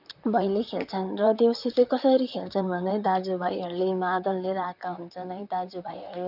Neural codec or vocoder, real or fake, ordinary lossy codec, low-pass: vocoder, 44.1 kHz, 128 mel bands, Pupu-Vocoder; fake; none; 5.4 kHz